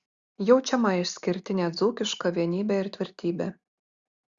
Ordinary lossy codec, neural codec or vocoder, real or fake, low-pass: Opus, 64 kbps; none; real; 7.2 kHz